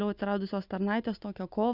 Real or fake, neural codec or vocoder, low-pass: real; none; 5.4 kHz